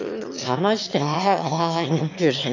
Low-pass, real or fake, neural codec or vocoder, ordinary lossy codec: 7.2 kHz; fake; autoencoder, 22.05 kHz, a latent of 192 numbers a frame, VITS, trained on one speaker; none